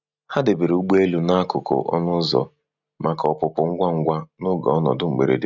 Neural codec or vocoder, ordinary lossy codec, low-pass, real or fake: none; none; 7.2 kHz; real